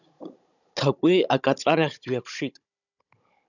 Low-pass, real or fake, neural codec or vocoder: 7.2 kHz; fake; codec, 16 kHz, 16 kbps, FunCodec, trained on Chinese and English, 50 frames a second